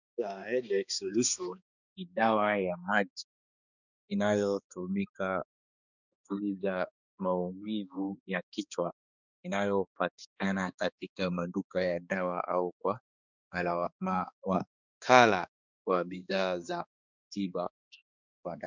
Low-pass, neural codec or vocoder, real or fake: 7.2 kHz; codec, 16 kHz, 2 kbps, X-Codec, HuBERT features, trained on balanced general audio; fake